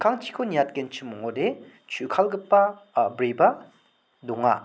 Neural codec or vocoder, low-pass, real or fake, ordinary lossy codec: none; none; real; none